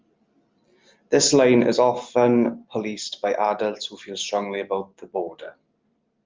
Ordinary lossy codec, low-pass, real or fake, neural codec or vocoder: Opus, 32 kbps; 7.2 kHz; real; none